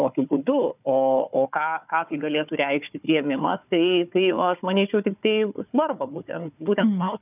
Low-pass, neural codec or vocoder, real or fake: 3.6 kHz; codec, 16 kHz, 4 kbps, FunCodec, trained on Chinese and English, 50 frames a second; fake